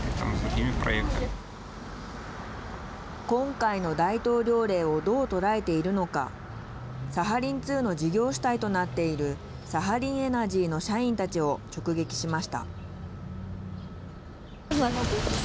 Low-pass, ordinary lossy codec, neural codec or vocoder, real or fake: none; none; none; real